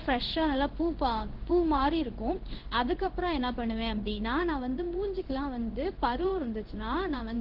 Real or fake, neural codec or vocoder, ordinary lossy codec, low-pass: fake; codec, 16 kHz in and 24 kHz out, 1 kbps, XY-Tokenizer; Opus, 16 kbps; 5.4 kHz